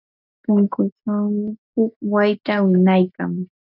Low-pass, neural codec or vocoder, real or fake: 5.4 kHz; none; real